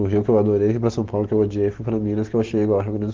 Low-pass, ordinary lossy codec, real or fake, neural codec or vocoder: 7.2 kHz; Opus, 16 kbps; real; none